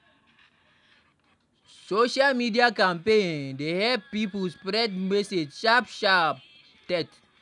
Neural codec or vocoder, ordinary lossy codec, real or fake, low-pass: none; none; real; 10.8 kHz